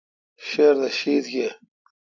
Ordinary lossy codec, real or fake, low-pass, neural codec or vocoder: AAC, 48 kbps; real; 7.2 kHz; none